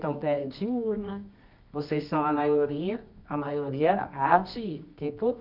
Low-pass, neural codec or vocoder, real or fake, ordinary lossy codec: 5.4 kHz; codec, 24 kHz, 0.9 kbps, WavTokenizer, medium music audio release; fake; none